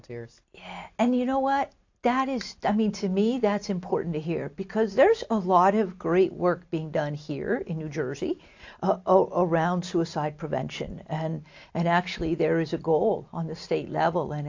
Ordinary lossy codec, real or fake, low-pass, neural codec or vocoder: AAC, 48 kbps; real; 7.2 kHz; none